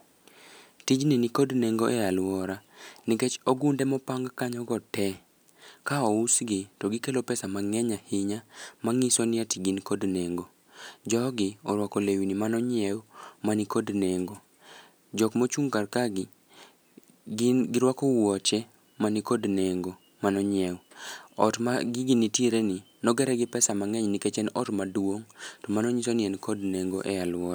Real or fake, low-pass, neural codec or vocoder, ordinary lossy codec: real; none; none; none